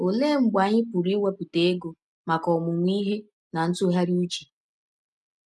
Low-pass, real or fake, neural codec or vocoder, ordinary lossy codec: none; real; none; none